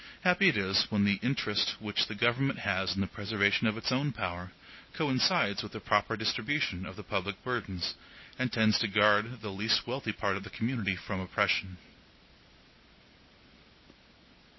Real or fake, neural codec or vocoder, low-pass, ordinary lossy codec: real; none; 7.2 kHz; MP3, 24 kbps